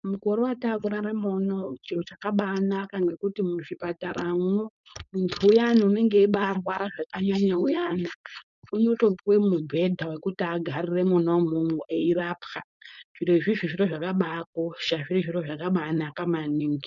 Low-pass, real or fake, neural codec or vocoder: 7.2 kHz; fake; codec, 16 kHz, 4.8 kbps, FACodec